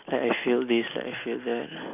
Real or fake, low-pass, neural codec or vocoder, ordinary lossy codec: real; 3.6 kHz; none; none